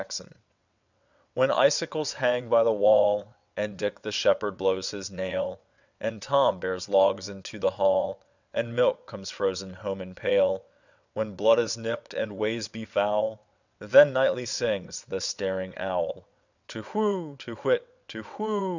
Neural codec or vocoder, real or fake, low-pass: vocoder, 22.05 kHz, 80 mel bands, WaveNeXt; fake; 7.2 kHz